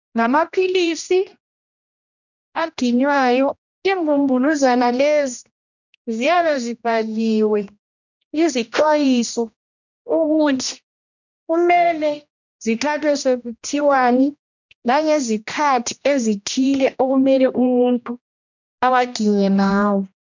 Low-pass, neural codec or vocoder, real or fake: 7.2 kHz; codec, 16 kHz, 1 kbps, X-Codec, HuBERT features, trained on general audio; fake